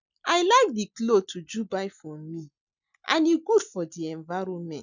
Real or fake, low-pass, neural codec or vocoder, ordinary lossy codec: real; 7.2 kHz; none; none